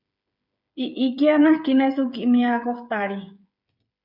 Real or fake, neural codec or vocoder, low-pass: fake; codec, 16 kHz, 8 kbps, FreqCodec, smaller model; 5.4 kHz